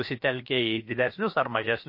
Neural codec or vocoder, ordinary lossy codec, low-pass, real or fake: codec, 16 kHz, about 1 kbps, DyCAST, with the encoder's durations; MP3, 32 kbps; 5.4 kHz; fake